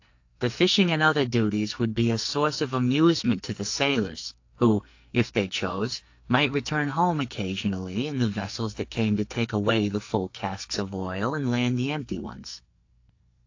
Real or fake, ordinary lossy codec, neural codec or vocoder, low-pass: fake; AAC, 48 kbps; codec, 44.1 kHz, 2.6 kbps, SNAC; 7.2 kHz